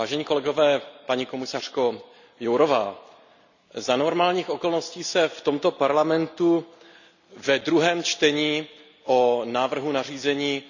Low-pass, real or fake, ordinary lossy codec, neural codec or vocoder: 7.2 kHz; real; none; none